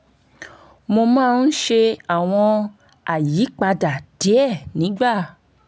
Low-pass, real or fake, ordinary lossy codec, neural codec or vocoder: none; real; none; none